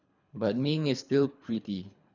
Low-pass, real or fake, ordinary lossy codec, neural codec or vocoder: 7.2 kHz; fake; none; codec, 24 kHz, 3 kbps, HILCodec